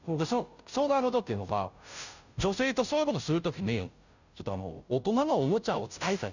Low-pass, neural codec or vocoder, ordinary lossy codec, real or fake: 7.2 kHz; codec, 16 kHz, 0.5 kbps, FunCodec, trained on Chinese and English, 25 frames a second; none; fake